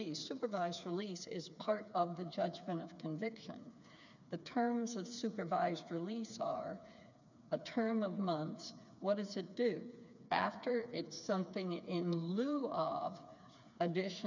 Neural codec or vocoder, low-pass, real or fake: codec, 16 kHz, 4 kbps, FreqCodec, smaller model; 7.2 kHz; fake